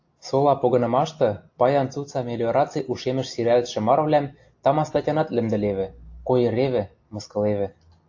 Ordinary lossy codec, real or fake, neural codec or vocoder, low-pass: AAC, 48 kbps; real; none; 7.2 kHz